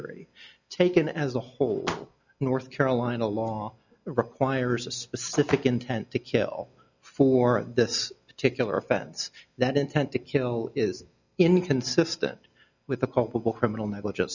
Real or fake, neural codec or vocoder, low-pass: real; none; 7.2 kHz